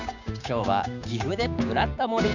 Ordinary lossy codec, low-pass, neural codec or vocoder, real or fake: none; 7.2 kHz; codec, 16 kHz, 6 kbps, DAC; fake